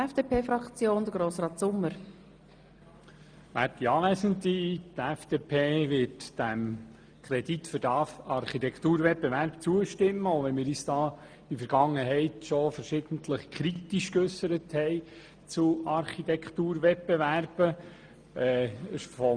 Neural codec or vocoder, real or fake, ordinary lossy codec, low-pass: none; real; Opus, 32 kbps; 9.9 kHz